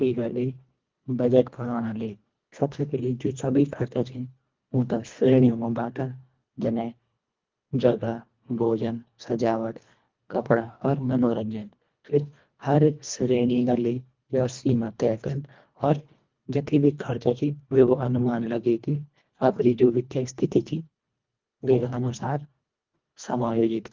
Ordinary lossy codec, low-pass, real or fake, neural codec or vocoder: Opus, 32 kbps; 7.2 kHz; fake; codec, 24 kHz, 1.5 kbps, HILCodec